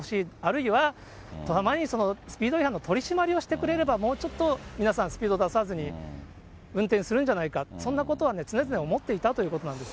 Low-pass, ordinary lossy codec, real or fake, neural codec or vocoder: none; none; real; none